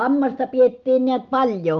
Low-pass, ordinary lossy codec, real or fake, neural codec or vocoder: 7.2 kHz; Opus, 32 kbps; real; none